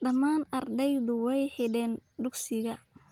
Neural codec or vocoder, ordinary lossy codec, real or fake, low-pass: none; Opus, 32 kbps; real; 19.8 kHz